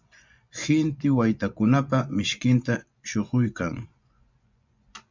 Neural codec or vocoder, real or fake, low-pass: vocoder, 24 kHz, 100 mel bands, Vocos; fake; 7.2 kHz